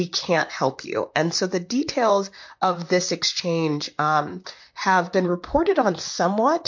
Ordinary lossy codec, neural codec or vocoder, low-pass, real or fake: MP3, 48 kbps; vocoder, 44.1 kHz, 128 mel bands, Pupu-Vocoder; 7.2 kHz; fake